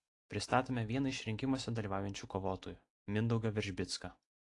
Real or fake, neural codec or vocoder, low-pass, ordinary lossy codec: real; none; 10.8 kHz; AAC, 48 kbps